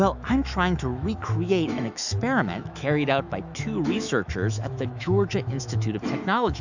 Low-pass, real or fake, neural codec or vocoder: 7.2 kHz; fake; autoencoder, 48 kHz, 128 numbers a frame, DAC-VAE, trained on Japanese speech